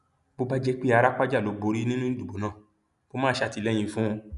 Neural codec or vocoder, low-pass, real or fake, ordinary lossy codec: none; 10.8 kHz; real; none